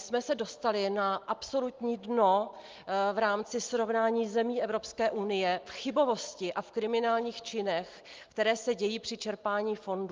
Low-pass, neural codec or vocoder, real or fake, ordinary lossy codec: 7.2 kHz; none; real; Opus, 32 kbps